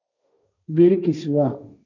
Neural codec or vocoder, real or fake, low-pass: codec, 16 kHz, 1.1 kbps, Voila-Tokenizer; fake; 7.2 kHz